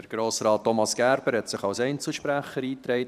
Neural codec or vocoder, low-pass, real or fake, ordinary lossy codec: vocoder, 44.1 kHz, 128 mel bands every 512 samples, BigVGAN v2; 14.4 kHz; fake; none